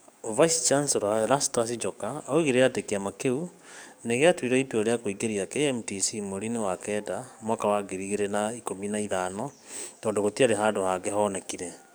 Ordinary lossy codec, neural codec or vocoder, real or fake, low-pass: none; codec, 44.1 kHz, 7.8 kbps, DAC; fake; none